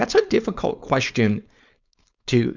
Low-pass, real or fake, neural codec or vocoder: 7.2 kHz; fake; codec, 16 kHz, 4.8 kbps, FACodec